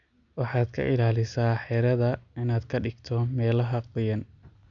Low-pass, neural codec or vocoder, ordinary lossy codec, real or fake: 7.2 kHz; none; none; real